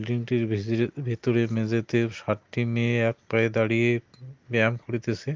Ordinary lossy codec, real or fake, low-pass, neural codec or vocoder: Opus, 32 kbps; real; 7.2 kHz; none